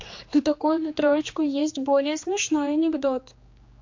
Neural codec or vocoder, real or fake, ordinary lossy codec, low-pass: codec, 16 kHz, 2 kbps, X-Codec, HuBERT features, trained on general audio; fake; MP3, 48 kbps; 7.2 kHz